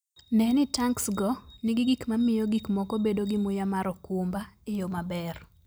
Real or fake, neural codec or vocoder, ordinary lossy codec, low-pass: real; none; none; none